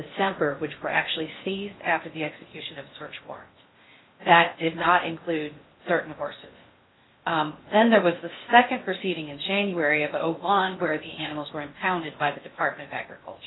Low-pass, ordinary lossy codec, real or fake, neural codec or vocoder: 7.2 kHz; AAC, 16 kbps; fake; codec, 16 kHz in and 24 kHz out, 0.6 kbps, FocalCodec, streaming, 4096 codes